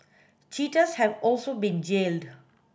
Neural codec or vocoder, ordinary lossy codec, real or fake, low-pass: none; none; real; none